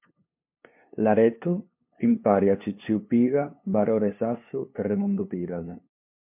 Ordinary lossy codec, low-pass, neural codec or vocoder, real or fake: MP3, 32 kbps; 3.6 kHz; codec, 16 kHz, 2 kbps, FunCodec, trained on LibriTTS, 25 frames a second; fake